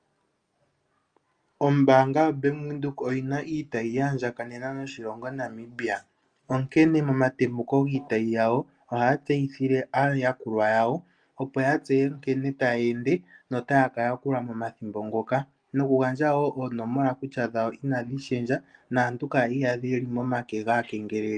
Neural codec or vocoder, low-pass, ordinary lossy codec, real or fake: none; 9.9 kHz; Opus, 32 kbps; real